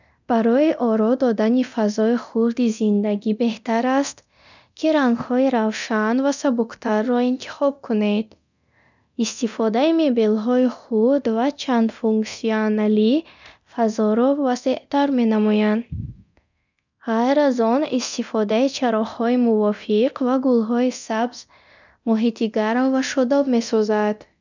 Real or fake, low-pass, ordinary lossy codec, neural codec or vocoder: fake; 7.2 kHz; none; codec, 24 kHz, 0.9 kbps, DualCodec